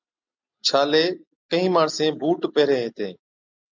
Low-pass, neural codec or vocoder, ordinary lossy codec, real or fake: 7.2 kHz; none; MP3, 64 kbps; real